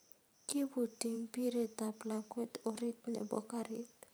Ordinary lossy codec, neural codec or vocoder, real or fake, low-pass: none; vocoder, 44.1 kHz, 128 mel bands every 512 samples, BigVGAN v2; fake; none